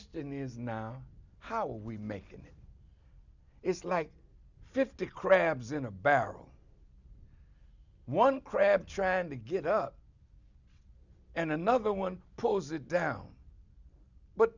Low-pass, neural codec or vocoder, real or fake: 7.2 kHz; none; real